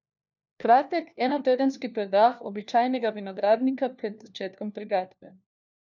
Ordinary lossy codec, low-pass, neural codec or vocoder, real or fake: none; 7.2 kHz; codec, 16 kHz, 1 kbps, FunCodec, trained on LibriTTS, 50 frames a second; fake